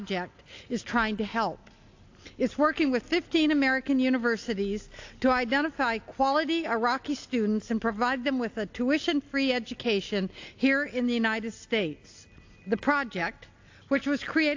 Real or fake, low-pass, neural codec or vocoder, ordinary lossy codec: real; 7.2 kHz; none; AAC, 48 kbps